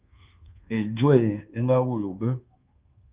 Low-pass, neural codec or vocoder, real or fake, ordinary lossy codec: 3.6 kHz; codec, 24 kHz, 1.2 kbps, DualCodec; fake; Opus, 32 kbps